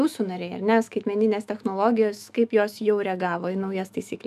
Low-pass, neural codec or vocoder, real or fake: 14.4 kHz; autoencoder, 48 kHz, 128 numbers a frame, DAC-VAE, trained on Japanese speech; fake